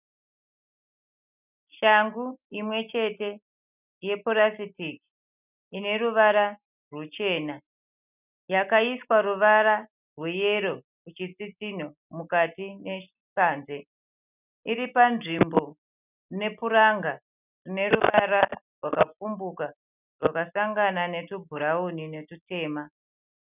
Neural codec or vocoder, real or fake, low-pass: none; real; 3.6 kHz